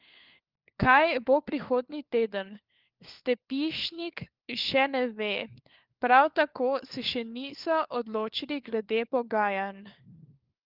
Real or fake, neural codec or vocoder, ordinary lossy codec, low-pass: fake; codec, 16 kHz, 4 kbps, FunCodec, trained on LibriTTS, 50 frames a second; Opus, 32 kbps; 5.4 kHz